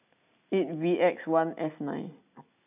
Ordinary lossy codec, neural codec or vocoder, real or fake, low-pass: none; none; real; 3.6 kHz